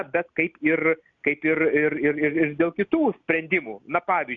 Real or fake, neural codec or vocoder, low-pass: real; none; 7.2 kHz